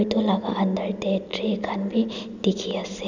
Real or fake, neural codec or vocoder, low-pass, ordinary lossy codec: real; none; 7.2 kHz; none